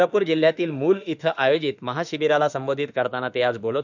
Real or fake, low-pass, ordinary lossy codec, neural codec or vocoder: fake; 7.2 kHz; none; autoencoder, 48 kHz, 32 numbers a frame, DAC-VAE, trained on Japanese speech